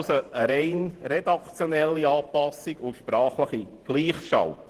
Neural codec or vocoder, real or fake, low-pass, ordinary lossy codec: vocoder, 48 kHz, 128 mel bands, Vocos; fake; 14.4 kHz; Opus, 16 kbps